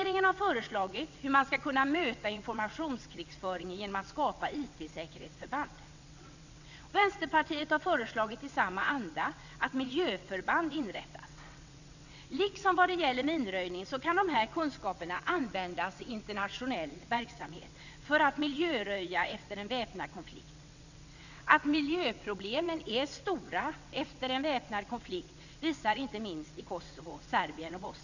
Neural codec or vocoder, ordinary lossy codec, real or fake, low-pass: vocoder, 22.05 kHz, 80 mel bands, WaveNeXt; none; fake; 7.2 kHz